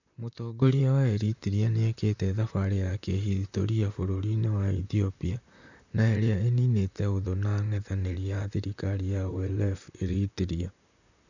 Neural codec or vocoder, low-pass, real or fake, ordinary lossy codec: vocoder, 44.1 kHz, 128 mel bands, Pupu-Vocoder; 7.2 kHz; fake; none